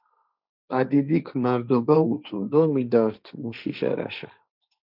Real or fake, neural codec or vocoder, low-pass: fake; codec, 16 kHz, 1.1 kbps, Voila-Tokenizer; 5.4 kHz